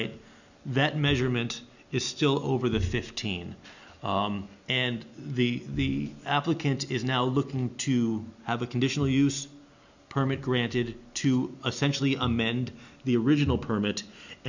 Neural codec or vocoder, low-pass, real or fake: none; 7.2 kHz; real